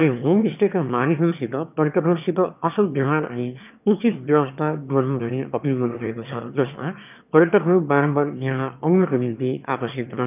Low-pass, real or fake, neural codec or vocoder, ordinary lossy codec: 3.6 kHz; fake; autoencoder, 22.05 kHz, a latent of 192 numbers a frame, VITS, trained on one speaker; none